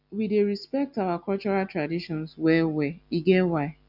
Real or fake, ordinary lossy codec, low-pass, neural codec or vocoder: real; none; 5.4 kHz; none